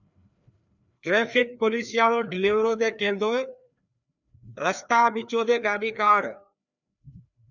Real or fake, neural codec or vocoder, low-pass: fake; codec, 16 kHz, 2 kbps, FreqCodec, larger model; 7.2 kHz